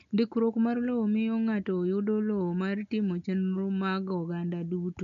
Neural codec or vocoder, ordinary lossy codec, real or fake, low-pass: none; none; real; 7.2 kHz